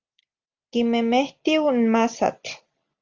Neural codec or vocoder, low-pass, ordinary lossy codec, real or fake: none; 7.2 kHz; Opus, 32 kbps; real